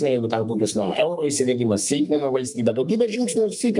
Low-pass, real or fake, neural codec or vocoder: 10.8 kHz; fake; codec, 32 kHz, 1.9 kbps, SNAC